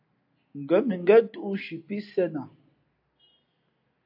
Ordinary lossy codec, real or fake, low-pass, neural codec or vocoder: AAC, 48 kbps; real; 5.4 kHz; none